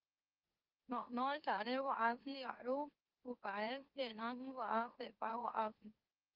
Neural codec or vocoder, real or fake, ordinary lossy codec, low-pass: autoencoder, 44.1 kHz, a latent of 192 numbers a frame, MeloTTS; fake; Opus, 32 kbps; 5.4 kHz